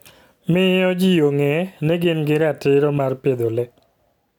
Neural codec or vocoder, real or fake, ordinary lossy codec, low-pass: none; real; none; 19.8 kHz